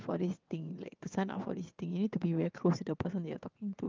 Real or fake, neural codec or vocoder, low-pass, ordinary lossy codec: fake; vocoder, 22.05 kHz, 80 mel bands, WaveNeXt; 7.2 kHz; Opus, 24 kbps